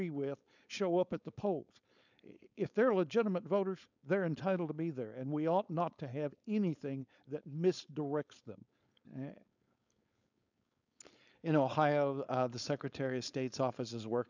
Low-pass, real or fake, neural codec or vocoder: 7.2 kHz; fake; codec, 16 kHz, 4.8 kbps, FACodec